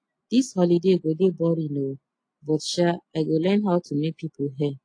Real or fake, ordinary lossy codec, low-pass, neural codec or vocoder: real; AAC, 48 kbps; 9.9 kHz; none